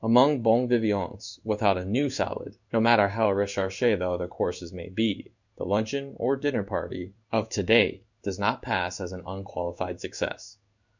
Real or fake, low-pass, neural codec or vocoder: fake; 7.2 kHz; codec, 16 kHz in and 24 kHz out, 1 kbps, XY-Tokenizer